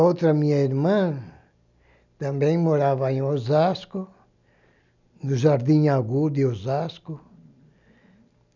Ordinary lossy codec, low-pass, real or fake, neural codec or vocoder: none; 7.2 kHz; real; none